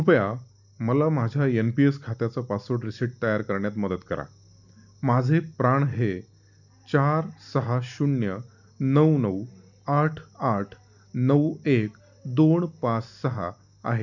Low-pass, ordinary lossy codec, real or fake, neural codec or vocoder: 7.2 kHz; none; real; none